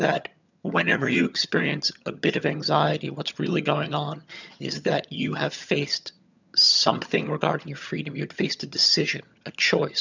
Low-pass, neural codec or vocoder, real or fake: 7.2 kHz; vocoder, 22.05 kHz, 80 mel bands, HiFi-GAN; fake